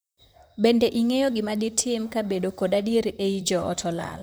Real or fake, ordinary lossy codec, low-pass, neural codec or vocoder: fake; none; none; vocoder, 44.1 kHz, 128 mel bands, Pupu-Vocoder